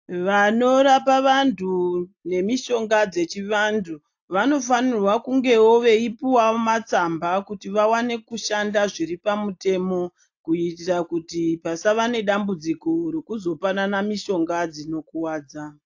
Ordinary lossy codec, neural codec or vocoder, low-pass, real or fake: AAC, 48 kbps; none; 7.2 kHz; real